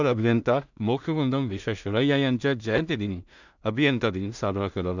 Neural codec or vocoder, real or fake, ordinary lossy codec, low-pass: codec, 16 kHz in and 24 kHz out, 0.4 kbps, LongCat-Audio-Codec, two codebook decoder; fake; none; 7.2 kHz